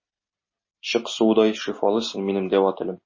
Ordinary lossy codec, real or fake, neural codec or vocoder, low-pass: MP3, 32 kbps; real; none; 7.2 kHz